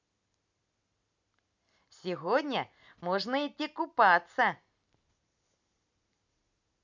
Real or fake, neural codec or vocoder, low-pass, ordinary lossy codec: real; none; 7.2 kHz; none